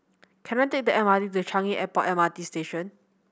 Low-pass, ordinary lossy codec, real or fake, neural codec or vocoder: none; none; real; none